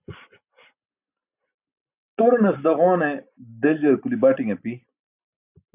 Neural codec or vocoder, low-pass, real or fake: none; 3.6 kHz; real